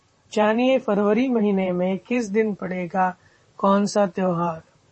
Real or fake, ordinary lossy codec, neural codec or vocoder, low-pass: fake; MP3, 32 kbps; vocoder, 44.1 kHz, 128 mel bands, Pupu-Vocoder; 10.8 kHz